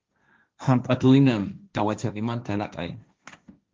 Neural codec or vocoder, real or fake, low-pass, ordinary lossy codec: codec, 16 kHz, 1.1 kbps, Voila-Tokenizer; fake; 7.2 kHz; Opus, 24 kbps